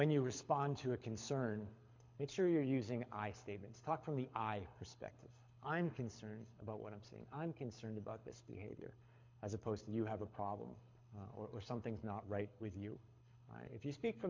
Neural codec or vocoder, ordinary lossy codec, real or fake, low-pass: codec, 24 kHz, 6 kbps, HILCodec; MP3, 48 kbps; fake; 7.2 kHz